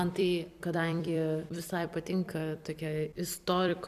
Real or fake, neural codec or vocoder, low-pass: fake; vocoder, 44.1 kHz, 128 mel bands every 256 samples, BigVGAN v2; 14.4 kHz